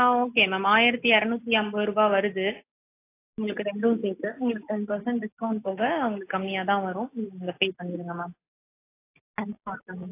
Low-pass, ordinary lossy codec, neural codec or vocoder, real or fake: 3.6 kHz; AAC, 24 kbps; none; real